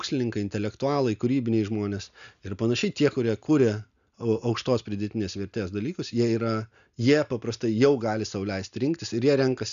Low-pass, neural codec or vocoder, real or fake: 7.2 kHz; none; real